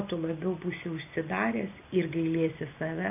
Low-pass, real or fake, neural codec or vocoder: 3.6 kHz; real; none